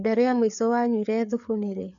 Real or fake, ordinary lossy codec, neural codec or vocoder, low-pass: fake; none; codec, 16 kHz, 4 kbps, FreqCodec, larger model; 7.2 kHz